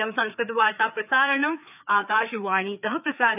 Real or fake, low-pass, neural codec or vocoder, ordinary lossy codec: fake; 3.6 kHz; codec, 16 kHz, 4 kbps, FreqCodec, larger model; none